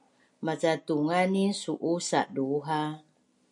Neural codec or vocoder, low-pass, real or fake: none; 10.8 kHz; real